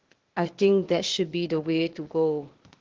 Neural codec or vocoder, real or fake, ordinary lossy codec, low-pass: codec, 16 kHz, 0.8 kbps, ZipCodec; fake; Opus, 16 kbps; 7.2 kHz